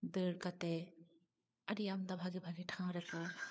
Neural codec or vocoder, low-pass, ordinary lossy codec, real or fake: codec, 16 kHz, 4 kbps, FunCodec, trained on LibriTTS, 50 frames a second; none; none; fake